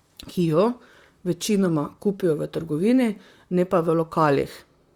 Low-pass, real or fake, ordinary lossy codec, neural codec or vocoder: 19.8 kHz; fake; Opus, 64 kbps; vocoder, 44.1 kHz, 128 mel bands, Pupu-Vocoder